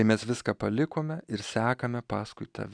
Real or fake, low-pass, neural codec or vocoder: real; 9.9 kHz; none